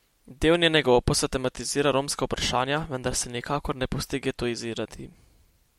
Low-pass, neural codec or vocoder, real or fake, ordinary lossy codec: 19.8 kHz; none; real; MP3, 64 kbps